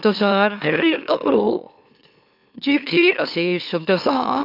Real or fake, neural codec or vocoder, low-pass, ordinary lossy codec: fake; autoencoder, 44.1 kHz, a latent of 192 numbers a frame, MeloTTS; 5.4 kHz; none